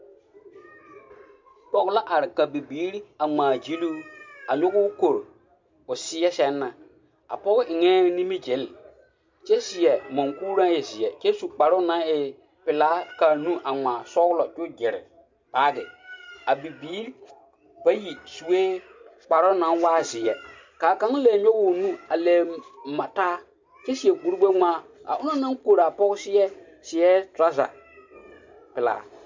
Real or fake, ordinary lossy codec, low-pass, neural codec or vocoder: real; MP3, 64 kbps; 7.2 kHz; none